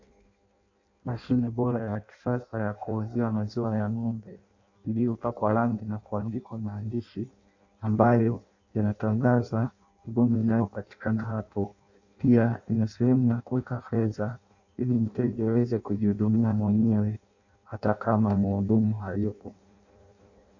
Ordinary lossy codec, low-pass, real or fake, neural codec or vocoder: AAC, 48 kbps; 7.2 kHz; fake; codec, 16 kHz in and 24 kHz out, 0.6 kbps, FireRedTTS-2 codec